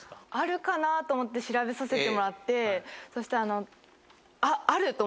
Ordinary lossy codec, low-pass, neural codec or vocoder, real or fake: none; none; none; real